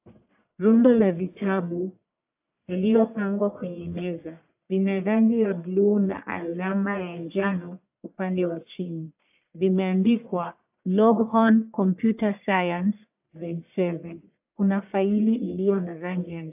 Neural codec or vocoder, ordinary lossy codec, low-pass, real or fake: codec, 44.1 kHz, 1.7 kbps, Pupu-Codec; AAC, 32 kbps; 3.6 kHz; fake